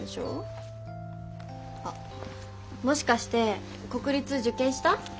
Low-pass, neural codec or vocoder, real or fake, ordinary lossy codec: none; none; real; none